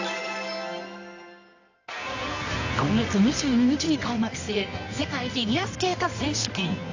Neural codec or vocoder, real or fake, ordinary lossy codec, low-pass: codec, 24 kHz, 0.9 kbps, WavTokenizer, medium music audio release; fake; AAC, 48 kbps; 7.2 kHz